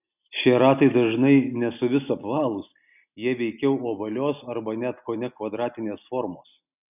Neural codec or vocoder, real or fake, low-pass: none; real; 3.6 kHz